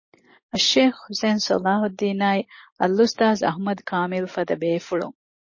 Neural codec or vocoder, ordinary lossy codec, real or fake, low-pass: none; MP3, 32 kbps; real; 7.2 kHz